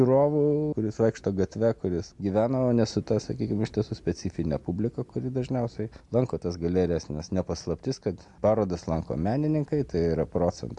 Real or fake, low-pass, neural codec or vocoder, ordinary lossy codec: real; 10.8 kHz; none; MP3, 64 kbps